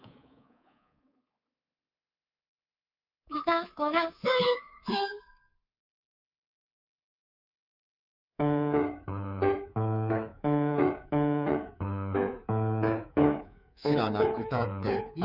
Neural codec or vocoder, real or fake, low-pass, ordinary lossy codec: codec, 24 kHz, 3.1 kbps, DualCodec; fake; 5.4 kHz; none